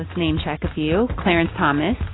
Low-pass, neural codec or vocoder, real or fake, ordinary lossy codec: 7.2 kHz; none; real; AAC, 16 kbps